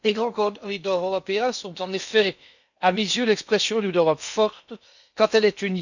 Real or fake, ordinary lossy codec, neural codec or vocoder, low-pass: fake; none; codec, 16 kHz in and 24 kHz out, 0.8 kbps, FocalCodec, streaming, 65536 codes; 7.2 kHz